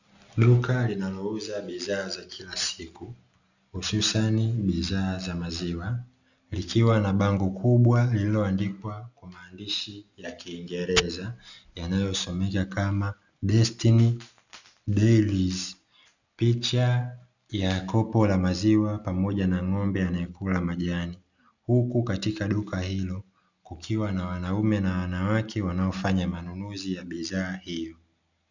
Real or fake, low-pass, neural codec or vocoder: real; 7.2 kHz; none